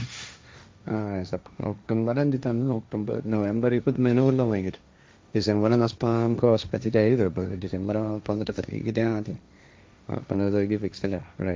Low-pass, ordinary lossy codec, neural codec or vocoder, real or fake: none; none; codec, 16 kHz, 1.1 kbps, Voila-Tokenizer; fake